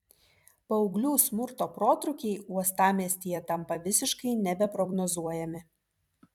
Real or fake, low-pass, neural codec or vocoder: fake; 19.8 kHz; vocoder, 44.1 kHz, 128 mel bands every 256 samples, BigVGAN v2